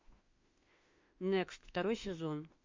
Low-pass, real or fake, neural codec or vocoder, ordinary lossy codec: 7.2 kHz; fake; autoencoder, 48 kHz, 32 numbers a frame, DAC-VAE, trained on Japanese speech; MP3, 48 kbps